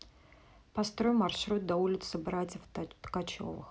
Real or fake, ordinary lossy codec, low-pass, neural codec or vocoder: real; none; none; none